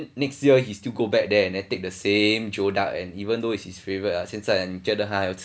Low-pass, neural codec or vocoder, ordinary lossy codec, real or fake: none; none; none; real